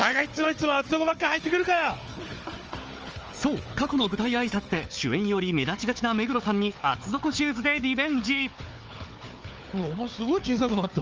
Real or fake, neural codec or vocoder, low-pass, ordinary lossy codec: fake; codec, 16 kHz, 4 kbps, FunCodec, trained on Chinese and English, 50 frames a second; 7.2 kHz; Opus, 24 kbps